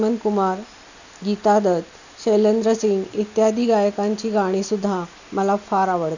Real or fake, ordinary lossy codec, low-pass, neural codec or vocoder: real; none; 7.2 kHz; none